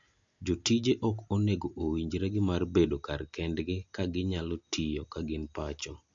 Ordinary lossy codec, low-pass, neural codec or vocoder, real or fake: MP3, 64 kbps; 7.2 kHz; none; real